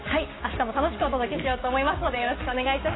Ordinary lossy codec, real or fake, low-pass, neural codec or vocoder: AAC, 16 kbps; fake; 7.2 kHz; codec, 16 kHz, 6 kbps, DAC